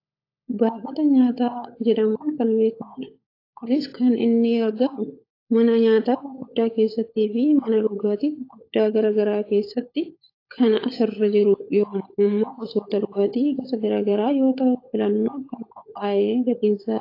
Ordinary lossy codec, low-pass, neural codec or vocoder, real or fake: AAC, 32 kbps; 5.4 kHz; codec, 16 kHz, 16 kbps, FunCodec, trained on LibriTTS, 50 frames a second; fake